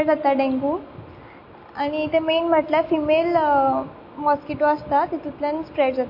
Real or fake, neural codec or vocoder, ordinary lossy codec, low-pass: real; none; MP3, 32 kbps; 5.4 kHz